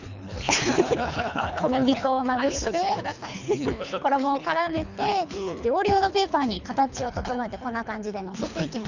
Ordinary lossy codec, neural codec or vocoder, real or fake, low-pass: none; codec, 24 kHz, 3 kbps, HILCodec; fake; 7.2 kHz